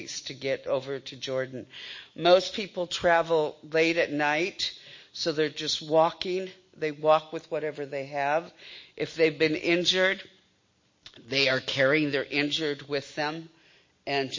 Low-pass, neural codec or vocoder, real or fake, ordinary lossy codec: 7.2 kHz; none; real; MP3, 32 kbps